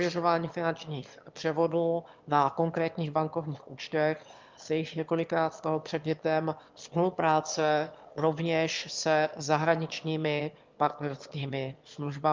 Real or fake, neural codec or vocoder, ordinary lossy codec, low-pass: fake; autoencoder, 22.05 kHz, a latent of 192 numbers a frame, VITS, trained on one speaker; Opus, 24 kbps; 7.2 kHz